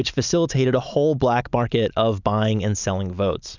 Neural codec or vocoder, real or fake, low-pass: none; real; 7.2 kHz